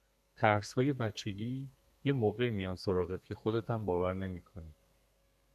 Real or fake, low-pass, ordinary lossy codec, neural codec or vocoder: fake; 14.4 kHz; AAC, 96 kbps; codec, 32 kHz, 1.9 kbps, SNAC